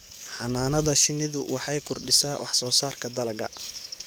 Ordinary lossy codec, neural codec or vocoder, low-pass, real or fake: none; codec, 44.1 kHz, 7.8 kbps, DAC; none; fake